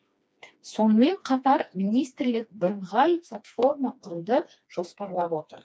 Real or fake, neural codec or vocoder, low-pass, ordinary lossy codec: fake; codec, 16 kHz, 2 kbps, FreqCodec, smaller model; none; none